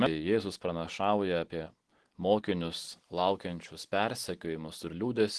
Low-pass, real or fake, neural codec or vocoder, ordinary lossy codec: 10.8 kHz; real; none; Opus, 16 kbps